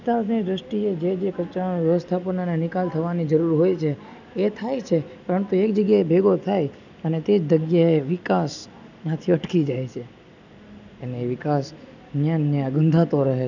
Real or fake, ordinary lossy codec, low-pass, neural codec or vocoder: real; none; 7.2 kHz; none